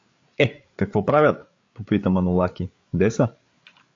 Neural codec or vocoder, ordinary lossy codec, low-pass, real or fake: codec, 16 kHz, 8 kbps, FreqCodec, larger model; AAC, 48 kbps; 7.2 kHz; fake